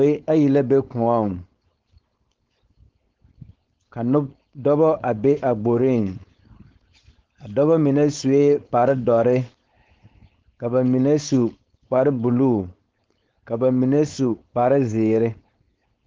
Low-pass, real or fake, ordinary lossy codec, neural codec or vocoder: 7.2 kHz; fake; Opus, 16 kbps; codec, 16 kHz, 4.8 kbps, FACodec